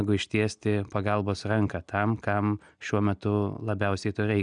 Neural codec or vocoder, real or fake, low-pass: none; real; 9.9 kHz